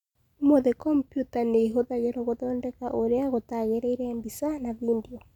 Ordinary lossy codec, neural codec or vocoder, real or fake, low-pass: none; none; real; 19.8 kHz